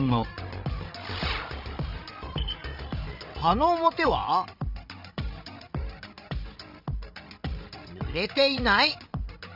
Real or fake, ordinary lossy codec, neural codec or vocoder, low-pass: fake; none; codec, 16 kHz, 16 kbps, FreqCodec, larger model; 5.4 kHz